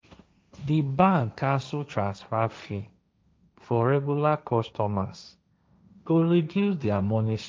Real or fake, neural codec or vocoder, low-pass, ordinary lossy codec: fake; codec, 16 kHz, 1.1 kbps, Voila-Tokenizer; none; none